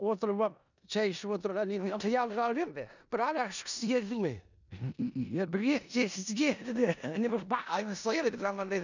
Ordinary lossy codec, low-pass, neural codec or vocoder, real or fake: none; 7.2 kHz; codec, 16 kHz in and 24 kHz out, 0.9 kbps, LongCat-Audio-Codec, four codebook decoder; fake